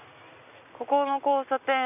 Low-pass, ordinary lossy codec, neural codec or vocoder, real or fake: 3.6 kHz; none; none; real